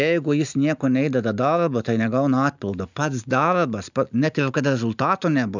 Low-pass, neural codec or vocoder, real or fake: 7.2 kHz; none; real